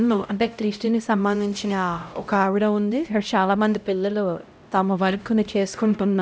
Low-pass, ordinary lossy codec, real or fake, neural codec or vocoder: none; none; fake; codec, 16 kHz, 0.5 kbps, X-Codec, HuBERT features, trained on LibriSpeech